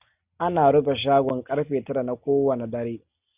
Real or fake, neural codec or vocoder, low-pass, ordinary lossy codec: real; none; 3.6 kHz; Opus, 64 kbps